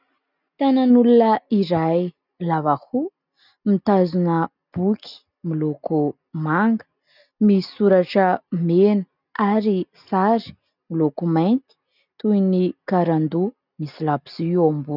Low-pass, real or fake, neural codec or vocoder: 5.4 kHz; real; none